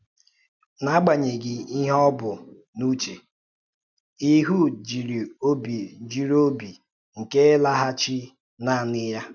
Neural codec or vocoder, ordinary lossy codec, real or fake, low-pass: none; none; real; 7.2 kHz